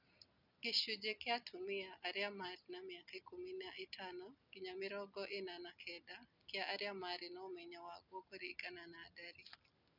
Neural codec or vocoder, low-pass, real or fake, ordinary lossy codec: none; 5.4 kHz; real; none